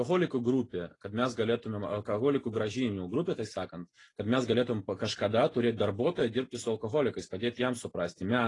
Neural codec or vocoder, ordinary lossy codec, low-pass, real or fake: none; AAC, 32 kbps; 10.8 kHz; real